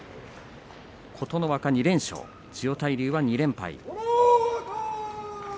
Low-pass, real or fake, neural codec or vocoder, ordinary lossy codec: none; real; none; none